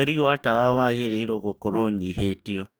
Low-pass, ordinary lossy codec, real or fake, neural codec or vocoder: none; none; fake; codec, 44.1 kHz, 2.6 kbps, DAC